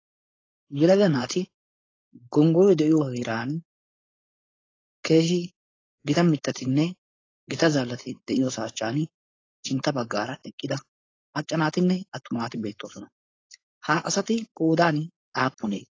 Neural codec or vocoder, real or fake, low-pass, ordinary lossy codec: codec, 16 kHz, 4.8 kbps, FACodec; fake; 7.2 kHz; AAC, 32 kbps